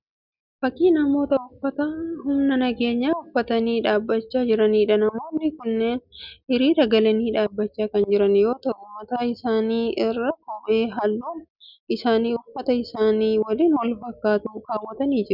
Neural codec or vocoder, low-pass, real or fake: none; 5.4 kHz; real